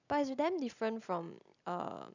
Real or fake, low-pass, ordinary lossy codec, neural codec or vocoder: real; 7.2 kHz; none; none